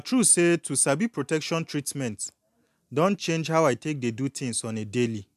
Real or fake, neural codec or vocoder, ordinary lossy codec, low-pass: real; none; MP3, 96 kbps; 14.4 kHz